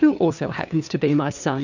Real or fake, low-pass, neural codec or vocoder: fake; 7.2 kHz; codec, 16 kHz, 2 kbps, FunCodec, trained on LibriTTS, 25 frames a second